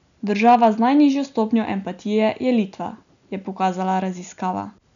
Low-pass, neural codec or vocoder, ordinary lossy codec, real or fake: 7.2 kHz; none; none; real